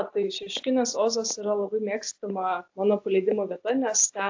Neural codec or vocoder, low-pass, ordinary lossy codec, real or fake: none; 7.2 kHz; AAC, 48 kbps; real